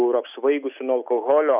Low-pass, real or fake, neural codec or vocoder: 3.6 kHz; real; none